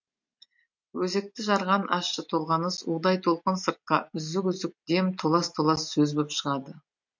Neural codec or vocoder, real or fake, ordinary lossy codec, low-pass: none; real; MP3, 48 kbps; 7.2 kHz